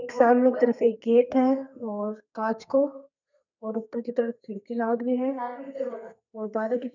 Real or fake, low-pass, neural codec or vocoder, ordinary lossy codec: fake; 7.2 kHz; codec, 32 kHz, 1.9 kbps, SNAC; none